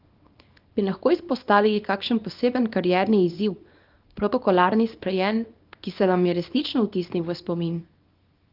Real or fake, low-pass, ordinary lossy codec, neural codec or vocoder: fake; 5.4 kHz; Opus, 32 kbps; codec, 24 kHz, 0.9 kbps, WavTokenizer, small release